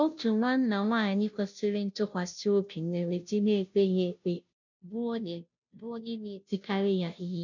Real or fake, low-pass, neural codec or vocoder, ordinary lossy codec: fake; 7.2 kHz; codec, 16 kHz, 0.5 kbps, FunCodec, trained on Chinese and English, 25 frames a second; none